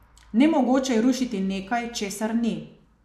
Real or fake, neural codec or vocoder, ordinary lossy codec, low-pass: real; none; AAC, 96 kbps; 14.4 kHz